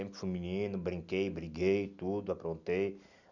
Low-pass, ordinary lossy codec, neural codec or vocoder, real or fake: 7.2 kHz; none; none; real